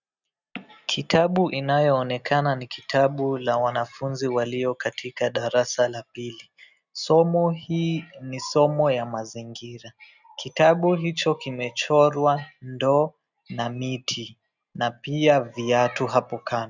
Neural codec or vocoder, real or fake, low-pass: none; real; 7.2 kHz